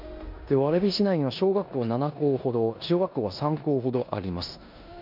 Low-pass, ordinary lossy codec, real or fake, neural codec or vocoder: 5.4 kHz; MP3, 48 kbps; fake; codec, 16 kHz in and 24 kHz out, 0.9 kbps, LongCat-Audio-Codec, four codebook decoder